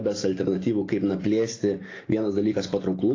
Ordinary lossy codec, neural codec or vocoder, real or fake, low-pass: AAC, 32 kbps; none; real; 7.2 kHz